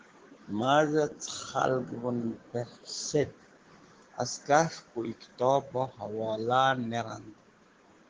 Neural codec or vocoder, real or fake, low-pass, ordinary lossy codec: codec, 16 kHz, 6 kbps, DAC; fake; 7.2 kHz; Opus, 16 kbps